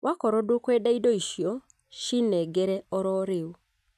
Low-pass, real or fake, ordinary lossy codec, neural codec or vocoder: 14.4 kHz; real; none; none